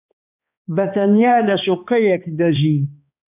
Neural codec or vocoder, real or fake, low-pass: codec, 16 kHz, 2 kbps, X-Codec, HuBERT features, trained on balanced general audio; fake; 3.6 kHz